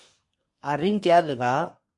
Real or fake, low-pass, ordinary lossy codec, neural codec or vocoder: fake; 10.8 kHz; MP3, 48 kbps; codec, 24 kHz, 1 kbps, SNAC